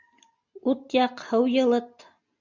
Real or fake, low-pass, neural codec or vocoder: real; 7.2 kHz; none